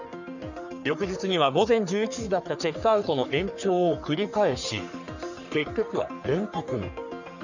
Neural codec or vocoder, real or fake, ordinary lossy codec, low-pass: codec, 44.1 kHz, 3.4 kbps, Pupu-Codec; fake; none; 7.2 kHz